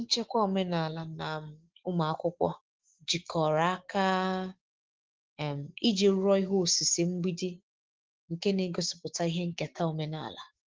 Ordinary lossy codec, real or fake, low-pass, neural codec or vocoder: Opus, 16 kbps; fake; 7.2 kHz; autoencoder, 48 kHz, 128 numbers a frame, DAC-VAE, trained on Japanese speech